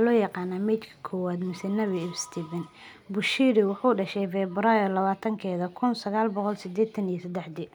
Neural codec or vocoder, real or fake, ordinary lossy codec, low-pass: none; real; none; 19.8 kHz